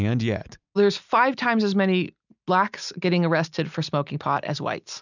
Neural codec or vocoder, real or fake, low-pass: none; real; 7.2 kHz